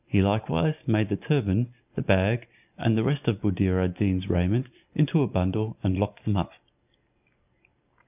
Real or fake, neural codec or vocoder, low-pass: real; none; 3.6 kHz